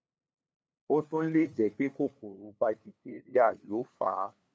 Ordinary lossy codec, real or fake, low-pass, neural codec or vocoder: none; fake; none; codec, 16 kHz, 2 kbps, FunCodec, trained on LibriTTS, 25 frames a second